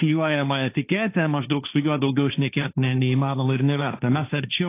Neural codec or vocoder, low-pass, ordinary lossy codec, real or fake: codec, 16 kHz, 1.1 kbps, Voila-Tokenizer; 3.6 kHz; AAC, 24 kbps; fake